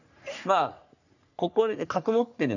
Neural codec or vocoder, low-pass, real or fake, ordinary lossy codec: codec, 44.1 kHz, 3.4 kbps, Pupu-Codec; 7.2 kHz; fake; none